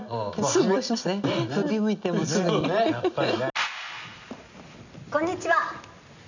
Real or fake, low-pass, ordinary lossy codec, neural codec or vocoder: real; 7.2 kHz; none; none